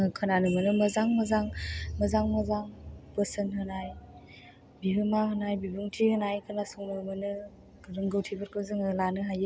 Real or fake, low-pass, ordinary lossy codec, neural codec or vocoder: real; none; none; none